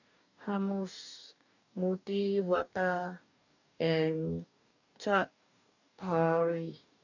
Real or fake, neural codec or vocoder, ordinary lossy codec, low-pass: fake; codec, 44.1 kHz, 2.6 kbps, DAC; none; 7.2 kHz